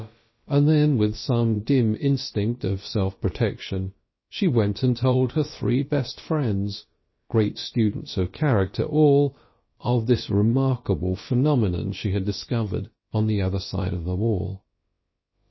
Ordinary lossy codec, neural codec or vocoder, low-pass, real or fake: MP3, 24 kbps; codec, 16 kHz, about 1 kbps, DyCAST, with the encoder's durations; 7.2 kHz; fake